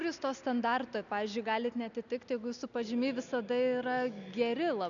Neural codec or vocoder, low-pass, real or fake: none; 7.2 kHz; real